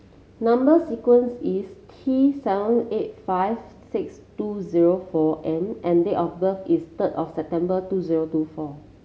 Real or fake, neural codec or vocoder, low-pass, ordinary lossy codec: real; none; none; none